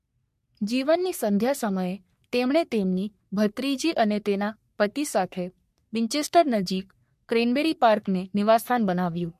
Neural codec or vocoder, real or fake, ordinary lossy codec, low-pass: codec, 44.1 kHz, 3.4 kbps, Pupu-Codec; fake; MP3, 64 kbps; 14.4 kHz